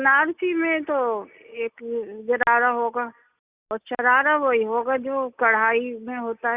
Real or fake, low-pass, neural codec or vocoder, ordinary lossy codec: real; 3.6 kHz; none; Opus, 64 kbps